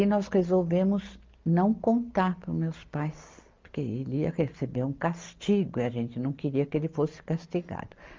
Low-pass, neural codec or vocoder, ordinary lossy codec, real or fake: 7.2 kHz; none; Opus, 32 kbps; real